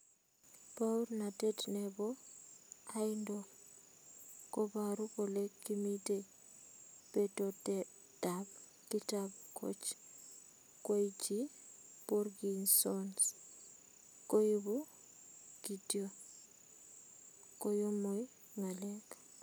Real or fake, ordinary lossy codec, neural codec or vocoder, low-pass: real; none; none; none